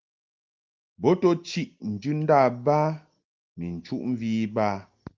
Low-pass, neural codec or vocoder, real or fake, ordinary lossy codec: 7.2 kHz; none; real; Opus, 24 kbps